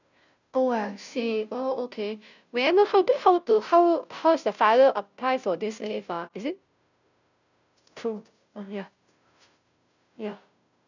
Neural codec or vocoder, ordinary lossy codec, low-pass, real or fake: codec, 16 kHz, 0.5 kbps, FunCodec, trained on Chinese and English, 25 frames a second; none; 7.2 kHz; fake